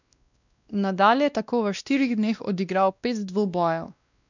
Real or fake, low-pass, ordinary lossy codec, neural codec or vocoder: fake; 7.2 kHz; none; codec, 16 kHz, 1 kbps, X-Codec, WavLM features, trained on Multilingual LibriSpeech